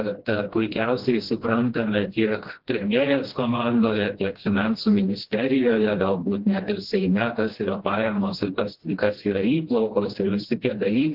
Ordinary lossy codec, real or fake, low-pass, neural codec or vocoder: Opus, 16 kbps; fake; 5.4 kHz; codec, 16 kHz, 1 kbps, FreqCodec, smaller model